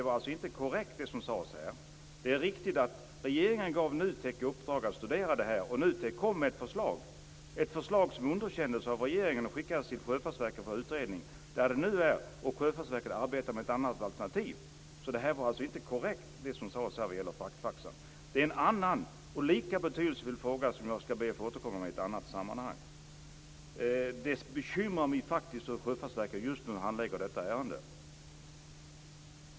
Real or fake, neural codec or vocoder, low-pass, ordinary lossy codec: real; none; none; none